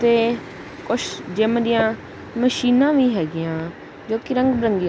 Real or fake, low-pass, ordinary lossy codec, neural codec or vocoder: real; none; none; none